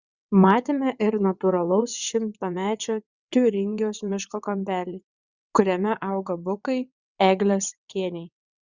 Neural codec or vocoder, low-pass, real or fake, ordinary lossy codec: vocoder, 22.05 kHz, 80 mel bands, WaveNeXt; 7.2 kHz; fake; Opus, 64 kbps